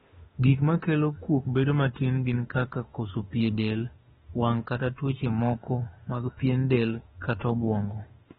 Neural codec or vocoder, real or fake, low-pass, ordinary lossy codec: autoencoder, 48 kHz, 32 numbers a frame, DAC-VAE, trained on Japanese speech; fake; 19.8 kHz; AAC, 16 kbps